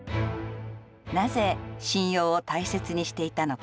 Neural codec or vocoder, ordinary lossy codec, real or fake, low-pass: none; none; real; none